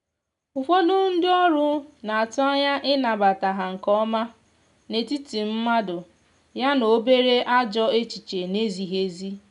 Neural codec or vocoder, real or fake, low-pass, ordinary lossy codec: none; real; 10.8 kHz; none